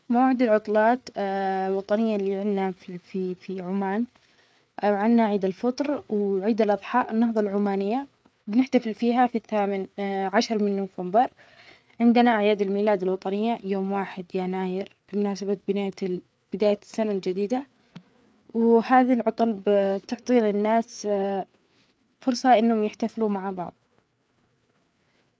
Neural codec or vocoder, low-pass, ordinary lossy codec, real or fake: codec, 16 kHz, 4 kbps, FreqCodec, larger model; none; none; fake